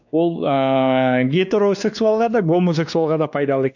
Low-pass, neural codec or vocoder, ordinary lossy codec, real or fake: 7.2 kHz; codec, 16 kHz, 2 kbps, X-Codec, WavLM features, trained on Multilingual LibriSpeech; none; fake